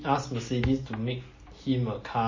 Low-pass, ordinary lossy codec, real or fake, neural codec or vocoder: 7.2 kHz; MP3, 32 kbps; real; none